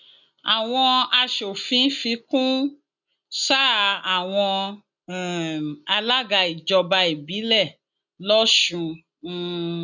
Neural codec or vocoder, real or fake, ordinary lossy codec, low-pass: none; real; none; 7.2 kHz